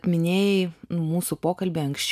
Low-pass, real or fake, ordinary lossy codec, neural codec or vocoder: 14.4 kHz; real; MP3, 96 kbps; none